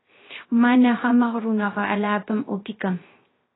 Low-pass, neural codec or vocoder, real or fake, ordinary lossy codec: 7.2 kHz; codec, 16 kHz, 0.3 kbps, FocalCodec; fake; AAC, 16 kbps